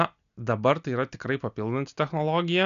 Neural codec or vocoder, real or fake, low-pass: none; real; 7.2 kHz